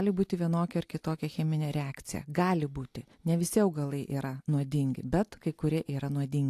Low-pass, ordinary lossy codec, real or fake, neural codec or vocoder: 14.4 kHz; AAC, 64 kbps; real; none